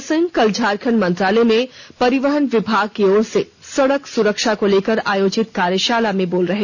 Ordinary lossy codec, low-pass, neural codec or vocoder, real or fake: none; 7.2 kHz; none; real